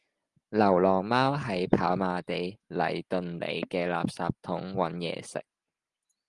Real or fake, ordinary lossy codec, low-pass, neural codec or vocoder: real; Opus, 24 kbps; 10.8 kHz; none